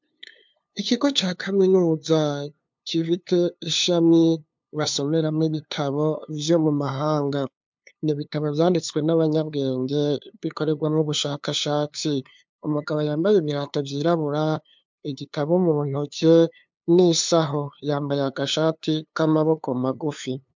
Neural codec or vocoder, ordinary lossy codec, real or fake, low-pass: codec, 16 kHz, 2 kbps, FunCodec, trained on LibriTTS, 25 frames a second; MP3, 64 kbps; fake; 7.2 kHz